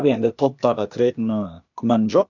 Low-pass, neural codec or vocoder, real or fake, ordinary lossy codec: 7.2 kHz; codec, 16 kHz, 0.8 kbps, ZipCodec; fake; none